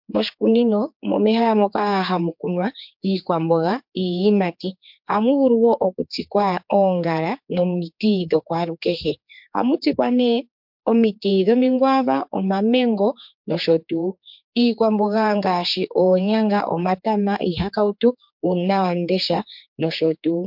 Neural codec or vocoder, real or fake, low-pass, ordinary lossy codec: codec, 16 kHz, 4 kbps, X-Codec, HuBERT features, trained on general audio; fake; 5.4 kHz; MP3, 48 kbps